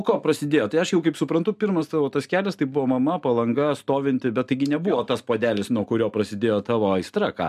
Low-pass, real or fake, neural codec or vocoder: 14.4 kHz; real; none